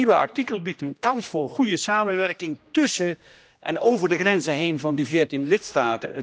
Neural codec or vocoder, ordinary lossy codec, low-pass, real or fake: codec, 16 kHz, 1 kbps, X-Codec, HuBERT features, trained on general audio; none; none; fake